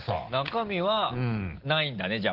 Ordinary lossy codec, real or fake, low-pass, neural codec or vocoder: Opus, 16 kbps; real; 5.4 kHz; none